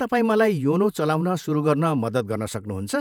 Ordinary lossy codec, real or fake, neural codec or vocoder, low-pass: none; fake; vocoder, 48 kHz, 128 mel bands, Vocos; 19.8 kHz